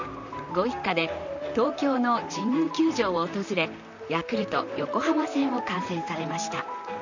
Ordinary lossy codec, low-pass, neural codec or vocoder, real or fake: none; 7.2 kHz; vocoder, 44.1 kHz, 128 mel bands, Pupu-Vocoder; fake